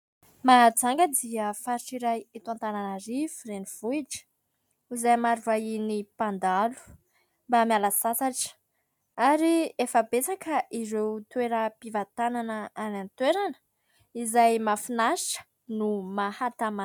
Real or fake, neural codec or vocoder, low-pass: real; none; 19.8 kHz